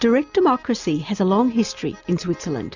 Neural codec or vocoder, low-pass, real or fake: none; 7.2 kHz; real